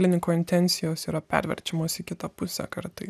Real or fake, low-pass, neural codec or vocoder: real; 14.4 kHz; none